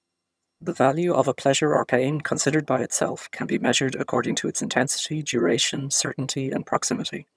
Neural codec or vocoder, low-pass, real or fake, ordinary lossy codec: vocoder, 22.05 kHz, 80 mel bands, HiFi-GAN; none; fake; none